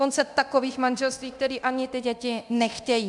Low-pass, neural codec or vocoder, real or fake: 10.8 kHz; codec, 24 kHz, 0.9 kbps, DualCodec; fake